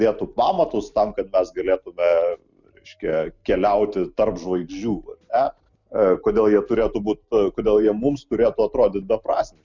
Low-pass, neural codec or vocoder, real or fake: 7.2 kHz; none; real